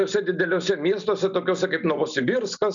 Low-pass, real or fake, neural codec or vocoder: 7.2 kHz; real; none